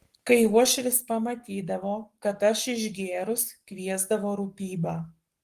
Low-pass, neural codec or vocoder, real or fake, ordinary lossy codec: 14.4 kHz; codec, 44.1 kHz, 7.8 kbps, Pupu-Codec; fake; Opus, 24 kbps